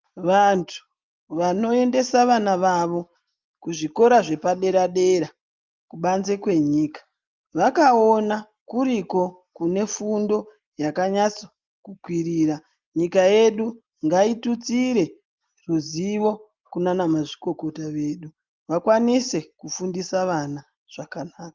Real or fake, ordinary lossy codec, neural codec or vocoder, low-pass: real; Opus, 24 kbps; none; 7.2 kHz